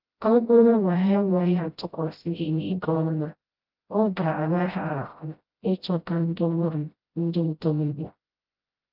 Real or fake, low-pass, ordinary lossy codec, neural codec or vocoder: fake; 5.4 kHz; Opus, 24 kbps; codec, 16 kHz, 0.5 kbps, FreqCodec, smaller model